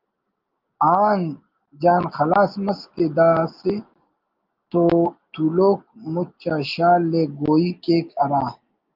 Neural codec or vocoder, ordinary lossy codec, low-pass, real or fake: none; Opus, 32 kbps; 5.4 kHz; real